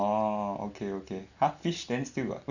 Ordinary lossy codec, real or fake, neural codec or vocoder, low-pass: none; real; none; 7.2 kHz